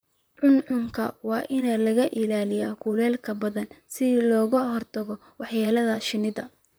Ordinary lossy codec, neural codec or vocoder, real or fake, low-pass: none; vocoder, 44.1 kHz, 128 mel bands, Pupu-Vocoder; fake; none